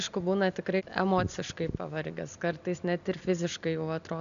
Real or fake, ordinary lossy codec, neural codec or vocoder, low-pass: real; MP3, 96 kbps; none; 7.2 kHz